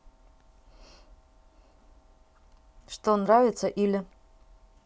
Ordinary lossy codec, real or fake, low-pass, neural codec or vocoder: none; real; none; none